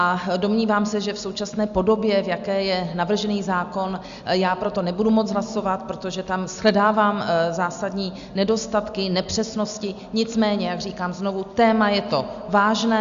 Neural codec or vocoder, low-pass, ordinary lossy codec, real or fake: none; 7.2 kHz; Opus, 64 kbps; real